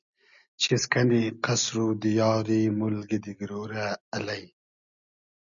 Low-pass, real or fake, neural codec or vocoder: 7.2 kHz; real; none